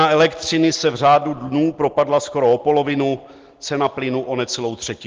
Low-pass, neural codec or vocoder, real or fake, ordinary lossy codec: 7.2 kHz; none; real; Opus, 16 kbps